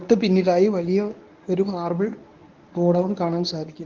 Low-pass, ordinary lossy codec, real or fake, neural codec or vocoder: 7.2 kHz; Opus, 32 kbps; fake; codec, 24 kHz, 0.9 kbps, WavTokenizer, medium speech release version 1